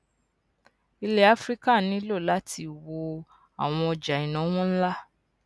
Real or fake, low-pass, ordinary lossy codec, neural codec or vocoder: real; none; none; none